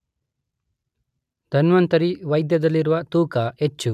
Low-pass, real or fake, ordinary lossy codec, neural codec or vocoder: 14.4 kHz; real; none; none